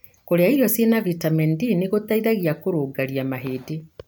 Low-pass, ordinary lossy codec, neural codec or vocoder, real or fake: none; none; none; real